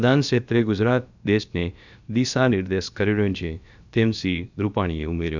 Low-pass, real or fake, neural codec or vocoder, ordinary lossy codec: 7.2 kHz; fake; codec, 16 kHz, about 1 kbps, DyCAST, with the encoder's durations; none